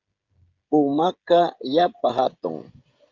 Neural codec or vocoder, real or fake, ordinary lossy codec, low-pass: codec, 16 kHz, 16 kbps, FreqCodec, smaller model; fake; Opus, 24 kbps; 7.2 kHz